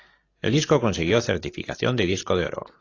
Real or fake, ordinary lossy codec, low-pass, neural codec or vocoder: real; AAC, 32 kbps; 7.2 kHz; none